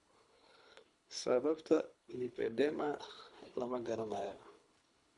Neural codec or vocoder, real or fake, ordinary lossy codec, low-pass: codec, 24 kHz, 3 kbps, HILCodec; fake; none; 10.8 kHz